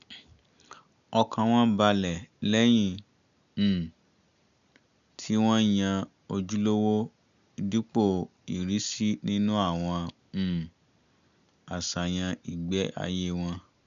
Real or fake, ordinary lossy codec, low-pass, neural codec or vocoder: real; MP3, 96 kbps; 7.2 kHz; none